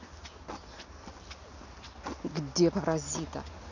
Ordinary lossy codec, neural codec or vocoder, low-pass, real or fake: none; none; 7.2 kHz; real